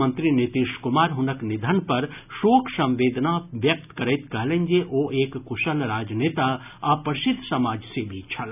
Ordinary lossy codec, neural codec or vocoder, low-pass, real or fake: none; none; 3.6 kHz; real